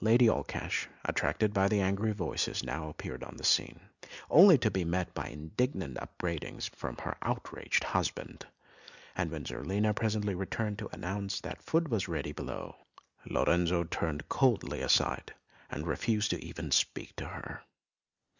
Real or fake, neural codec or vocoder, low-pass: real; none; 7.2 kHz